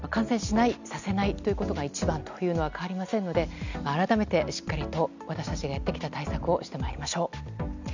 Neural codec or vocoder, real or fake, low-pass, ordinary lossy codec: none; real; 7.2 kHz; none